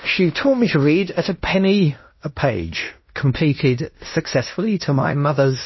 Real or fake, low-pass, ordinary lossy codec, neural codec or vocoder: fake; 7.2 kHz; MP3, 24 kbps; codec, 16 kHz in and 24 kHz out, 0.9 kbps, LongCat-Audio-Codec, fine tuned four codebook decoder